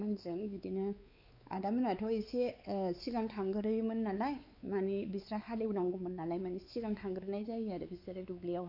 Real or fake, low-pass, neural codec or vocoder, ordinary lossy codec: fake; 5.4 kHz; codec, 16 kHz, 4 kbps, X-Codec, WavLM features, trained on Multilingual LibriSpeech; none